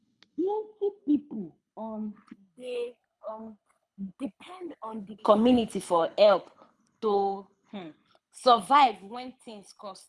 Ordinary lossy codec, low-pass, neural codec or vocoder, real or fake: none; none; codec, 24 kHz, 6 kbps, HILCodec; fake